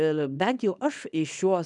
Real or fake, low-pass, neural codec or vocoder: fake; 10.8 kHz; codec, 24 kHz, 0.9 kbps, WavTokenizer, small release